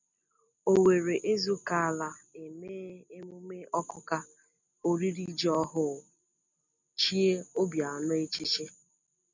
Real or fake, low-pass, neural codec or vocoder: real; 7.2 kHz; none